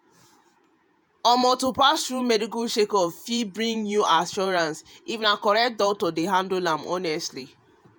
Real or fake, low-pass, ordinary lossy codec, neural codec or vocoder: fake; none; none; vocoder, 48 kHz, 128 mel bands, Vocos